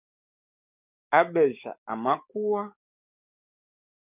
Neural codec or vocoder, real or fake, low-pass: codec, 44.1 kHz, 7.8 kbps, DAC; fake; 3.6 kHz